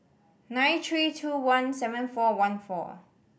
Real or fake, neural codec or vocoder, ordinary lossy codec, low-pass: real; none; none; none